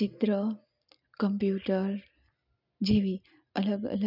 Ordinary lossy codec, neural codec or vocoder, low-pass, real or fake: none; none; 5.4 kHz; real